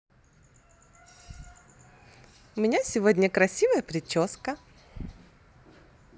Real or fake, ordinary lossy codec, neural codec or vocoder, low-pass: real; none; none; none